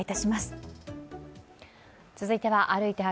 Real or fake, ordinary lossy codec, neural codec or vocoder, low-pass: real; none; none; none